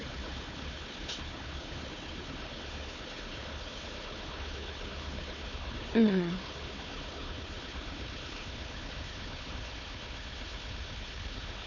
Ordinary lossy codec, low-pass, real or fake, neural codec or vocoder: none; 7.2 kHz; fake; codec, 16 kHz, 4 kbps, FunCodec, trained on Chinese and English, 50 frames a second